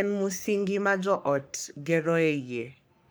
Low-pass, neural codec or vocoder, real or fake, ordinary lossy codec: none; codec, 44.1 kHz, 3.4 kbps, Pupu-Codec; fake; none